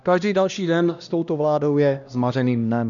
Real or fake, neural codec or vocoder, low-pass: fake; codec, 16 kHz, 1 kbps, X-Codec, HuBERT features, trained on LibriSpeech; 7.2 kHz